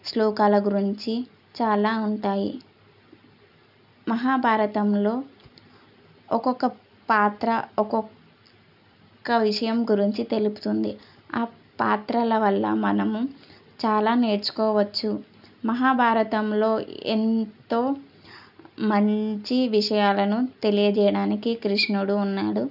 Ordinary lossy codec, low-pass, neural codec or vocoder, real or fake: none; 5.4 kHz; none; real